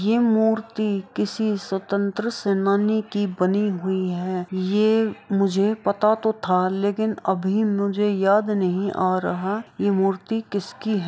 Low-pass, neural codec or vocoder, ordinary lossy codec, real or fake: none; none; none; real